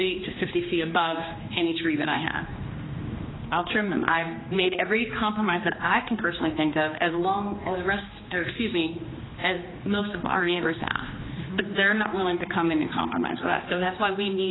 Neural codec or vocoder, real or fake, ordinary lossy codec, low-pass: codec, 16 kHz, 2 kbps, X-Codec, HuBERT features, trained on general audio; fake; AAC, 16 kbps; 7.2 kHz